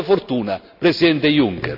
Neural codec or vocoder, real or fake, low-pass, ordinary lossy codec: none; real; 5.4 kHz; none